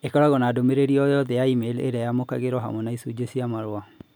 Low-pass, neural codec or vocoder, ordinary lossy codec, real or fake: none; none; none; real